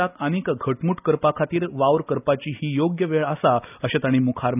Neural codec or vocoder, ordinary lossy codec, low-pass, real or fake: none; none; 3.6 kHz; real